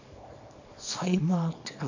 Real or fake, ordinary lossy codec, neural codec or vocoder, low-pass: fake; AAC, 48 kbps; codec, 24 kHz, 0.9 kbps, WavTokenizer, small release; 7.2 kHz